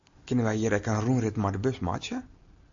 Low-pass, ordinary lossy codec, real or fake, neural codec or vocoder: 7.2 kHz; AAC, 64 kbps; real; none